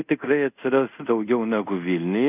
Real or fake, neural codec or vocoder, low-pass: fake; codec, 24 kHz, 0.5 kbps, DualCodec; 3.6 kHz